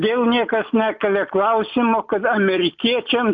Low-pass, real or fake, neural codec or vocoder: 7.2 kHz; real; none